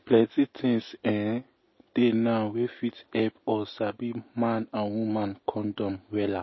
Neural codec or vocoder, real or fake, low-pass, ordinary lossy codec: none; real; 7.2 kHz; MP3, 24 kbps